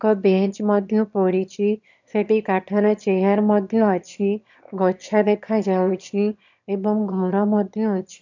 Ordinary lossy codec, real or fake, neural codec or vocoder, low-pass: none; fake; autoencoder, 22.05 kHz, a latent of 192 numbers a frame, VITS, trained on one speaker; 7.2 kHz